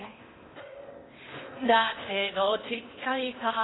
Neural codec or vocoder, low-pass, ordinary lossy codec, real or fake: codec, 16 kHz in and 24 kHz out, 0.8 kbps, FocalCodec, streaming, 65536 codes; 7.2 kHz; AAC, 16 kbps; fake